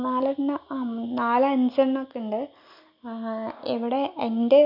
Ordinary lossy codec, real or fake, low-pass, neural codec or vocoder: none; real; 5.4 kHz; none